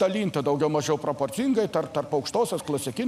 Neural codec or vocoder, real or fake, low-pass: none; real; 14.4 kHz